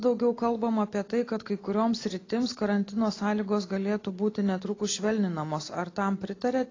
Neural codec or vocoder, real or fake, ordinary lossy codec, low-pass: none; real; AAC, 32 kbps; 7.2 kHz